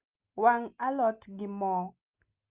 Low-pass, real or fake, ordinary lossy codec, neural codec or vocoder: 3.6 kHz; real; Opus, 24 kbps; none